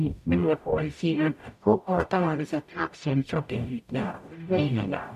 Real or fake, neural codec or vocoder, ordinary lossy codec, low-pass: fake; codec, 44.1 kHz, 0.9 kbps, DAC; MP3, 96 kbps; 14.4 kHz